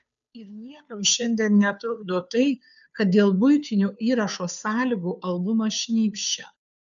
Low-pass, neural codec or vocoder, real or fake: 7.2 kHz; codec, 16 kHz, 2 kbps, FunCodec, trained on Chinese and English, 25 frames a second; fake